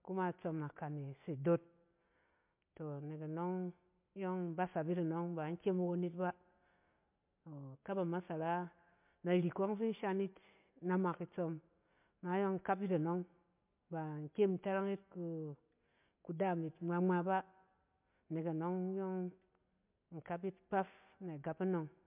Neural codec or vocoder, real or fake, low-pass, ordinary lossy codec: none; real; 3.6 kHz; MP3, 32 kbps